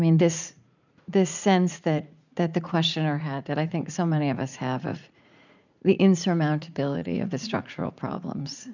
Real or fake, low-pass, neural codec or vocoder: fake; 7.2 kHz; vocoder, 44.1 kHz, 80 mel bands, Vocos